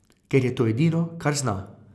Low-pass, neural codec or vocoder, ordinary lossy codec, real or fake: none; none; none; real